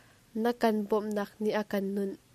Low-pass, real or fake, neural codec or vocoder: 14.4 kHz; real; none